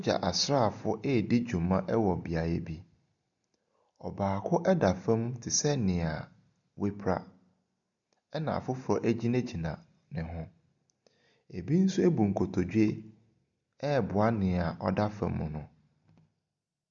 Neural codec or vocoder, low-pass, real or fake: none; 7.2 kHz; real